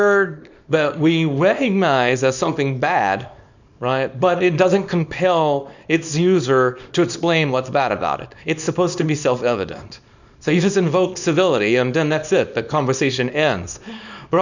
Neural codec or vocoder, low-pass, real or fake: codec, 24 kHz, 0.9 kbps, WavTokenizer, small release; 7.2 kHz; fake